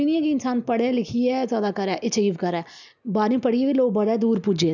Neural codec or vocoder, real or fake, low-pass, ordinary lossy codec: none; real; 7.2 kHz; none